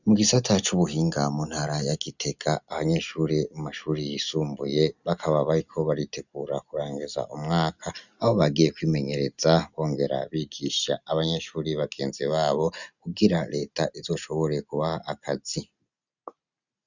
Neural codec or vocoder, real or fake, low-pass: none; real; 7.2 kHz